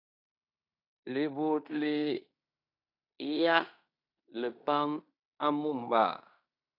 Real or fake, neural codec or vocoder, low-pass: fake; codec, 16 kHz in and 24 kHz out, 0.9 kbps, LongCat-Audio-Codec, fine tuned four codebook decoder; 5.4 kHz